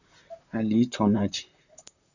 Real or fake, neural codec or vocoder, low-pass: fake; vocoder, 44.1 kHz, 128 mel bands, Pupu-Vocoder; 7.2 kHz